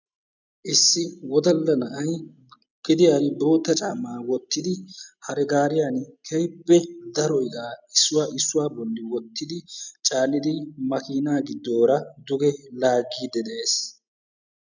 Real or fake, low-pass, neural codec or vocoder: real; 7.2 kHz; none